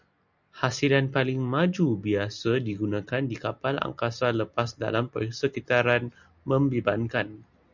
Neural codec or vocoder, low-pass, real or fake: none; 7.2 kHz; real